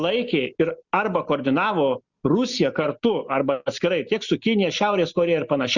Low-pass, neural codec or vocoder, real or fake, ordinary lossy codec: 7.2 kHz; none; real; Opus, 64 kbps